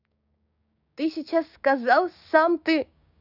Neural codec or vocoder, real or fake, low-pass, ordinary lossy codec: codec, 16 kHz, 6 kbps, DAC; fake; 5.4 kHz; none